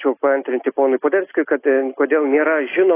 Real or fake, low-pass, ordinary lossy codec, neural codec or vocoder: real; 3.6 kHz; AAC, 24 kbps; none